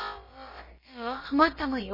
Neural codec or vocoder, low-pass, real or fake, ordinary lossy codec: codec, 16 kHz, about 1 kbps, DyCAST, with the encoder's durations; 5.4 kHz; fake; MP3, 24 kbps